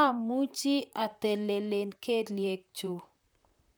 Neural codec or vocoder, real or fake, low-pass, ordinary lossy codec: vocoder, 44.1 kHz, 128 mel bands, Pupu-Vocoder; fake; none; none